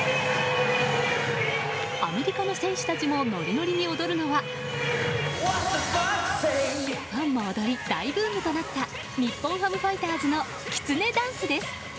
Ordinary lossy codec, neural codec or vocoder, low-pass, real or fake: none; none; none; real